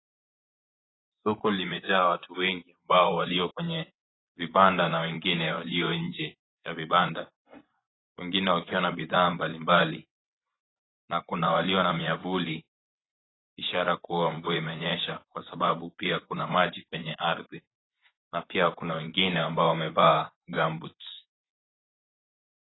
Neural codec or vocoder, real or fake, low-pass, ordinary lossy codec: vocoder, 24 kHz, 100 mel bands, Vocos; fake; 7.2 kHz; AAC, 16 kbps